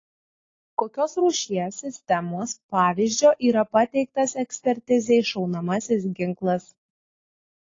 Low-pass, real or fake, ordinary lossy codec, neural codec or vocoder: 7.2 kHz; real; AAC, 32 kbps; none